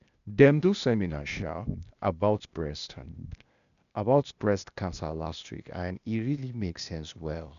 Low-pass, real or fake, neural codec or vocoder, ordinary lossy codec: 7.2 kHz; fake; codec, 16 kHz, 0.8 kbps, ZipCodec; none